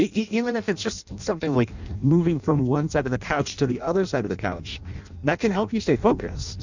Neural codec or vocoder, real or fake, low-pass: codec, 16 kHz in and 24 kHz out, 0.6 kbps, FireRedTTS-2 codec; fake; 7.2 kHz